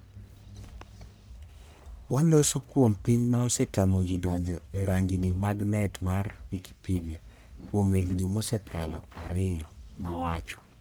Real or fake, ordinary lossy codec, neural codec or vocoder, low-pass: fake; none; codec, 44.1 kHz, 1.7 kbps, Pupu-Codec; none